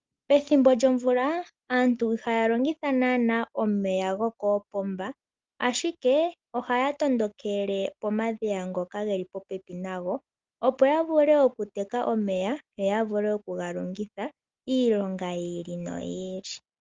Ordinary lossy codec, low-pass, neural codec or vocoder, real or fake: Opus, 32 kbps; 7.2 kHz; none; real